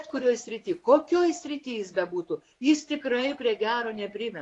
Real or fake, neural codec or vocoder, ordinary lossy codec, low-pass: fake; vocoder, 24 kHz, 100 mel bands, Vocos; AAC, 48 kbps; 10.8 kHz